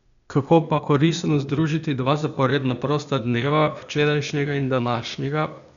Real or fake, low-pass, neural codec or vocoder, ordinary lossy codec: fake; 7.2 kHz; codec, 16 kHz, 0.8 kbps, ZipCodec; none